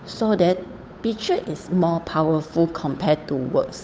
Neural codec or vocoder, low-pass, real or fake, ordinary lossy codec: codec, 16 kHz, 8 kbps, FunCodec, trained on Chinese and English, 25 frames a second; none; fake; none